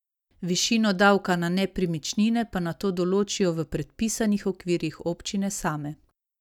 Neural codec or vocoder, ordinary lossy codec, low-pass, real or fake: none; none; 19.8 kHz; real